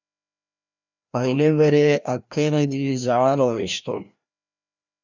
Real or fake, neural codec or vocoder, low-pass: fake; codec, 16 kHz, 1 kbps, FreqCodec, larger model; 7.2 kHz